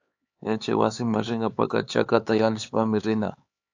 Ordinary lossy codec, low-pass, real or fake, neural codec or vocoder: AAC, 48 kbps; 7.2 kHz; fake; codec, 16 kHz, 4 kbps, X-Codec, HuBERT features, trained on LibriSpeech